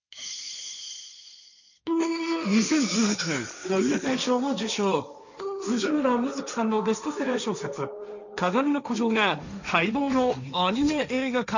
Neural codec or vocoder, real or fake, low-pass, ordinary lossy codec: codec, 16 kHz, 1.1 kbps, Voila-Tokenizer; fake; 7.2 kHz; none